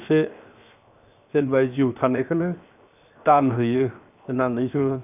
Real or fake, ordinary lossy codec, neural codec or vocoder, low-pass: fake; none; codec, 16 kHz, 0.7 kbps, FocalCodec; 3.6 kHz